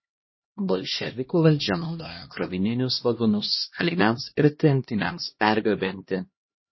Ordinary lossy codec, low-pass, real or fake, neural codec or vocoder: MP3, 24 kbps; 7.2 kHz; fake; codec, 16 kHz, 1 kbps, X-Codec, HuBERT features, trained on LibriSpeech